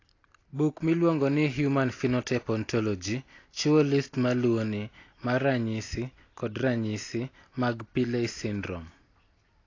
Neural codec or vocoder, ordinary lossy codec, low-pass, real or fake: none; AAC, 32 kbps; 7.2 kHz; real